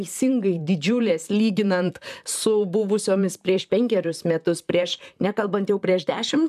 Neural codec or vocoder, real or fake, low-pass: vocoder, 44.1 kHz, 128 mel bands, Pupu-Vocoder; fake; 14.4 kHz